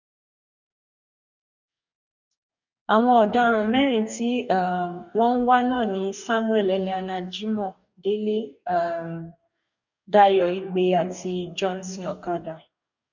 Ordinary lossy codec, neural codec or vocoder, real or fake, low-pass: none; codec, 44.1 kHz, 2.6 kbps, DAC; fake; 7.2 kHz